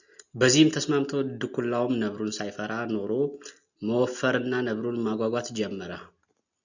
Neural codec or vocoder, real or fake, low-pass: none; real; 7.2 kHz